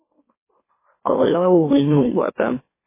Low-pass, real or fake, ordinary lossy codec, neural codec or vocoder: 3.6 kHz; fake; MP3, 16 kbps; autoencoder, 44.1 kHz, a latent of 192 numbers a frame, MeloTTS